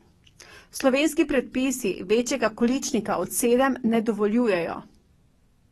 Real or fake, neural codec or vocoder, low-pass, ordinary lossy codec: fake; codec, 44.1 kHz, 7.8 kbps, DAC; 19.8 kHz; AAC, 32 kbps